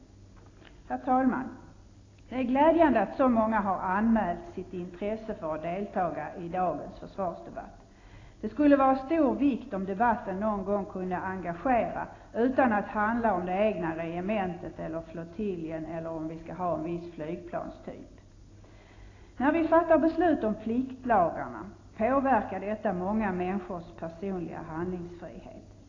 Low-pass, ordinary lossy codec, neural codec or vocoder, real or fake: 7.2 kHz; AAC, 32 kbps; none; real